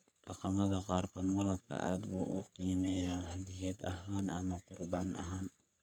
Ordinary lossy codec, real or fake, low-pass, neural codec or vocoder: none; fake; none; codec, 44.1 kHz, 3.4 kbps, Pupu-Codec